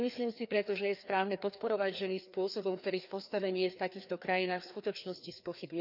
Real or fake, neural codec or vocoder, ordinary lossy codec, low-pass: fake; codec, 16 kHz, 2 kbps, FreqCodec, larger model; none; 5.4 kHz